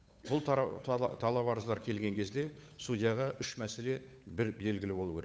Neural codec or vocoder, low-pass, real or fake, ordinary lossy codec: codec, 16 kHz, 8 kbps, FunCodec, trained on Chinese and English, 25 frames a second; none; fake; none